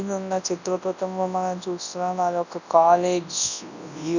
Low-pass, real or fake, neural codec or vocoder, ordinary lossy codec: 7.2 kHz; fake; codec, 24 kHz, 0.9 kbps, WavTokenizer, large speech release; none